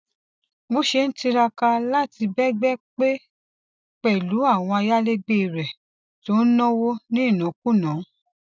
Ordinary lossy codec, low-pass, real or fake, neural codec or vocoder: none; none; real; none